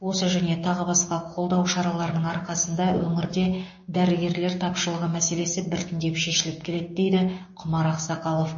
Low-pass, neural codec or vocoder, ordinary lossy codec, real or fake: 7.2 kHz; codec, 16 kHz, 6 kbps, DAC; MP3, 32 kbps; fake